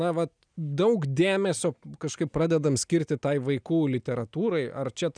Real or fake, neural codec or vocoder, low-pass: real; none; 9.9 kHz